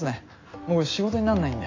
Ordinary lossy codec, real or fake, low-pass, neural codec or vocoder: AAC, 32 kbps; real; 7.2 kHz; none